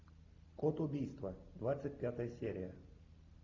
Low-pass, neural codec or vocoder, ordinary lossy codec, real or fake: 7.2 kHz; none; MP3, 64 kbps; real